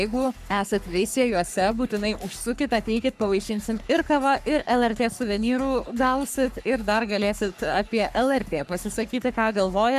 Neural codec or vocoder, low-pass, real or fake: codec, 44.1 kHz, 3.4 kbps, Pupu-Codec; 14.4 kHz; fake